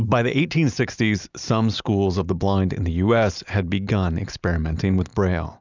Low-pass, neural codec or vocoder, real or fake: 7.2 kHz; none; real